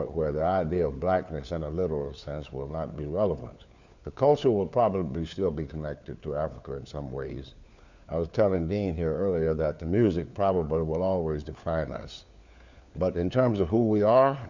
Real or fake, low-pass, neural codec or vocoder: fake; 7.2 kHz; codec, 16 kHz, 4 kbps, FunCodec, trained on LibriTTS, 50 frames a second